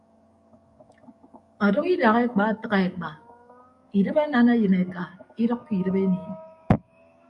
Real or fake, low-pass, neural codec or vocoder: fake; 10.8 kHz; codec, 44.1 kHz, 7.8 kbps, DAC